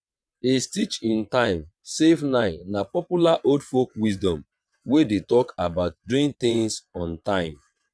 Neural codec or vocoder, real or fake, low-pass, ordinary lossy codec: vocoder, 22.05 kHz, 80 mel bands, WaveNeXt; fake; none; none